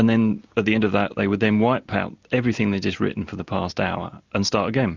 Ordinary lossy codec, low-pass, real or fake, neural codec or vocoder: Opus, 64 kbps; 7.2 kHz; real; none